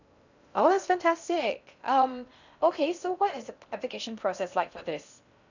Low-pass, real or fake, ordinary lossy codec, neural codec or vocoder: 7.2 kHz; fake; none; codec, 16 kHz in and 24 kHz out, 0.6 kbps, FocalCodec, streaming, 2048 codes